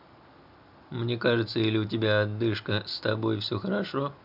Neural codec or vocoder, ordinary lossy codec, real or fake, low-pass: none; none; real; 5.4 kHz